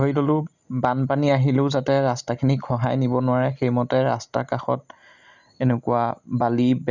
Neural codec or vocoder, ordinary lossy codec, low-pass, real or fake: none; none; 7.2 kHz; real